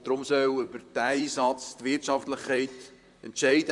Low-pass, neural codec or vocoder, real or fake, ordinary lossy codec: 10.8 kHz; vocoder, 44.1 kHz, 128 mel bands, Pupu-Vocoder; fake; none